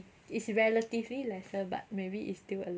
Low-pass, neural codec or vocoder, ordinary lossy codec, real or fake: none; none; none; real